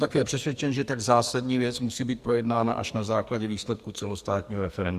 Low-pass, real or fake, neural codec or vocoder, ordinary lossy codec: 14.4 kHz; fake; codec, 44.1 kHz, 2.6 kbps, SNAC; Opus, 64 kbps